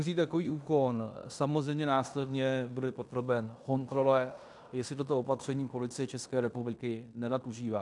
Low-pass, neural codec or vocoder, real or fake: 10.8 kHz; codec, 16 kHz in and 24 kHz out, 0.9 kbps, LongCat-Audio-Codec, fine tuned four codebook decoder; fake